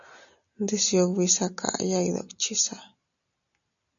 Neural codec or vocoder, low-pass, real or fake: none; 7.2 kHz; real